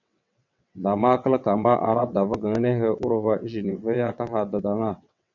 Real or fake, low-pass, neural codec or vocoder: fake; 7.2 kHz; vocoder, 22.05 kHz, 80 mel bands, WaveNeXt